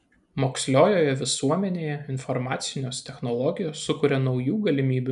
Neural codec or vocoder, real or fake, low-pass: none; real; 10.8 kHz